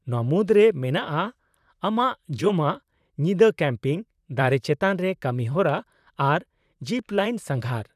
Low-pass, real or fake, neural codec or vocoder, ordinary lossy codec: 14.4 kHz; fake; vocoder, 44.1 kHz, 128 mel bands, Pupu-Vocoder; none